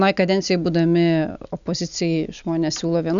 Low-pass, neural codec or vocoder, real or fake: 7.2 kHz; none; real